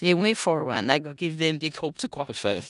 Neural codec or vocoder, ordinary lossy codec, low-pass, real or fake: codec, 16 kHz in and 24 kHz out, 0.4 kbps, LongCat-Audio-Codec, four codebook decoder; none; 10.8 kHz; fake